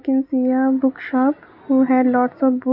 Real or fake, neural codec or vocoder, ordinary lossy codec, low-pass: real; none; none; 5.4 kHz